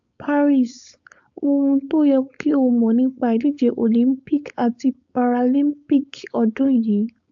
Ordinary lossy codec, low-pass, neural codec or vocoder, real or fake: AAC, 64 kbps; 7.2 kHz; codec, 16 kHz, 4.8 kbps, FACodec; fake